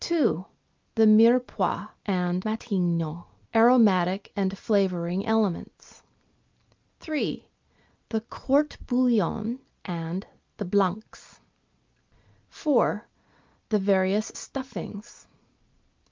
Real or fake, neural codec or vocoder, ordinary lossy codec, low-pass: real; none; Opus, 24 kbps; 7.2 kHz